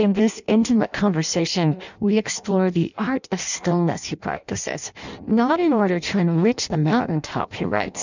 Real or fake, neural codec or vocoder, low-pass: fake; codec, 16 kHz in and 24 kHz out, 0.6 kbps, FireRedTTS-2 codec; 7.2 kHz